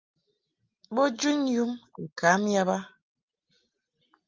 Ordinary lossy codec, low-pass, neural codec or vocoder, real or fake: Opus, 24 kbps; 7.2 kHz; none; real